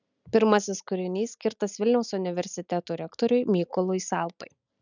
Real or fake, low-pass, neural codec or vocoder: real; 7.2 kHz; none